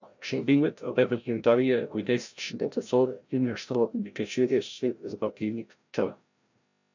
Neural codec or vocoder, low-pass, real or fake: codec, 16 kHz, 0.5 kbps, FreqCodec, larger model; 7.2 kHz; fake